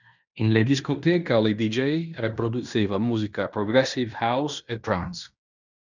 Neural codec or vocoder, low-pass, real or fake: codec, 16 kHz in and 24 kHz out, 0.9 kbps, LongCat-Audio-Codec, fine tuned four codebook decoder; 7.2 kHz; fake